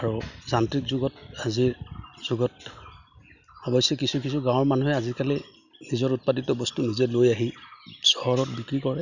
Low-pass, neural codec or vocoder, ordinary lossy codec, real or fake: 7.2 kHz; none; none; real